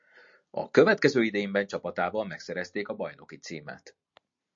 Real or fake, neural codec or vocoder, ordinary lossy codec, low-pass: real; none; MP3, 48 kbps; 7.2 kHz